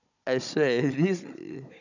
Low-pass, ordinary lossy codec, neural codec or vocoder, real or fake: 7.2 kHz; none; codec, 16 kHz, 16 kbps, FunCodec, trained on Chinese and English, 50 frames a second; fake